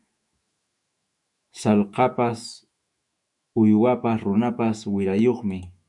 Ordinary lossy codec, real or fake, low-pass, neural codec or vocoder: MP3, 96 kbps; fake; 10.8 kHz; autoencoder, 48 kHz, 128 numbers a frame, DAC-VAE, trained on Japanese speech